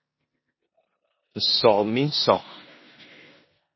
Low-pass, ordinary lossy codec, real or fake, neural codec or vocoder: 7.2 kHz; MP3, 24 kbps; fake; codec, 16 kHz in and 24 kHz out, 0.9 kbps, LongCat-Audio-Codec, four codebook decoder